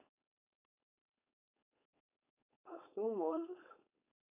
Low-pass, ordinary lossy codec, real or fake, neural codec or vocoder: 3.6 kHz; none; fake; codec, 16 kHz, 4.8 kbps, FACodec